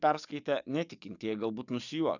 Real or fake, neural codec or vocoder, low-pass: fake; codec, 44.1 kHz, 7.8 kbps, DAC; 7.2 kHz